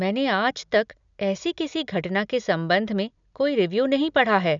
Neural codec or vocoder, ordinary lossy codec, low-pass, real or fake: none; none; 7.2 kHz; real